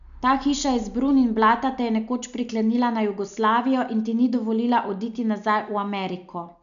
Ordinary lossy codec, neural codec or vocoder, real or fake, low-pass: none; none; real; 7.2 kHz